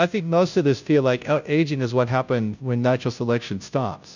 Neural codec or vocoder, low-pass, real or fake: codec, 16 kHz, 0.5 kbps, FunCodec, trained on Chinese and English, 25 frames a second; 7.2 kHz; fake